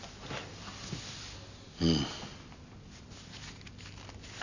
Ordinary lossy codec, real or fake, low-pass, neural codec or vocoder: none; real; 7.2 kHz; none